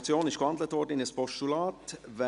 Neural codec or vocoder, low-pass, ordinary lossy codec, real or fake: none; 10.8 kHz; none; real